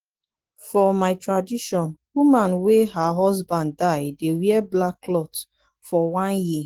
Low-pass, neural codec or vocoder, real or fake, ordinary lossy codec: 19.8 kHz; autoencoder, 48 kHz, 128 numbers a frame, DAC-VAE, trained on Japanese speech; fake; Opus, 16 kbps